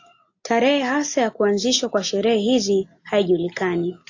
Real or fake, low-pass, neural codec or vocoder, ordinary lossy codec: real; 7.2 kHz; none; AAC, 48 kbps